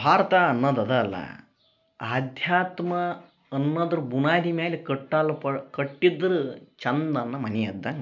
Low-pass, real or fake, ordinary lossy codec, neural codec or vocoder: 7.2 kHz; real; none; none